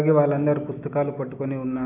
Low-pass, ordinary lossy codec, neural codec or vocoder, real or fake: 3.6 kHz; AAC, 32 kbps; none; real